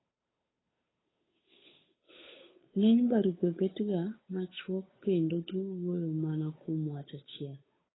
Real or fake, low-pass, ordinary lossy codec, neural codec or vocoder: fake; 7.2 kHz; AAC, 16 kbps; codec, 16 kHz, 8 kbps, FunCodec, trained on Chinese and English, 25 frames a second